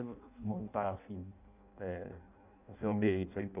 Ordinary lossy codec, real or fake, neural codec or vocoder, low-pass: none; fake; codec, 16 kHz in and 24 kHz out, 0.6 kbps, FireRedTTS-2 codec; 3.6 kHz